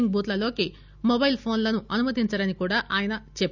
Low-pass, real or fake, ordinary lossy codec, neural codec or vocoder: 7.2 kHz; real; none; none